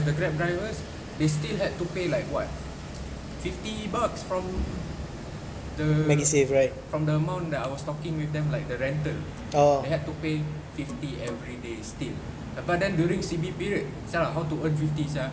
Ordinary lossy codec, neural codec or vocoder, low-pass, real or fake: none; none; none; real